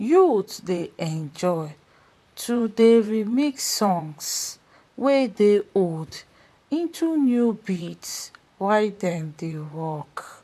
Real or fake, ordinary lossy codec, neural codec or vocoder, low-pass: fake; MP3, 96 kbps; vocoder, 44.1 kHz, 128 mel bands, Pupu-Vocoder; 14.4 kHz